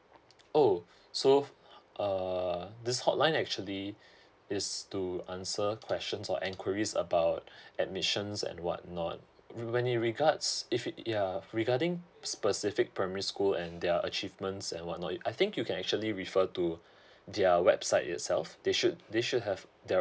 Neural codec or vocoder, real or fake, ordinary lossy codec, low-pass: none; real; none; none